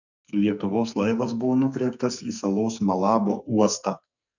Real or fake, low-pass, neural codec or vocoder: fake; 7.2 kHz; codec, 44.1 kHz, 2.6 kbps, SNAC